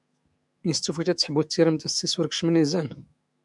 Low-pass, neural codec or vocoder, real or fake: 10.8 kHz; autoencoder, 48 kHz, 128 numbers a frame, DAC-VAE, trained on Japanese speech; fake